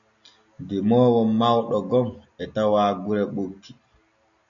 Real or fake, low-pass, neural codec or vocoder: real; 7.2 kHz; none